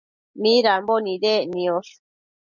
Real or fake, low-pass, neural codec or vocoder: real; 7.2 kHz; none